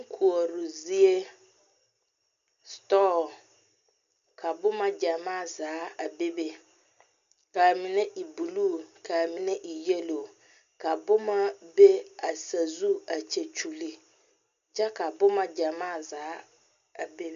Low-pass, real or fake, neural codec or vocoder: 7.2 kHz; real; none